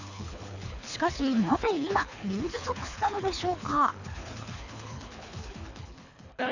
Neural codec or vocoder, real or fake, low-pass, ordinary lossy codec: codec, 24 kHz, 3 kbps, HILCodec; fake; 7.2 kHz; none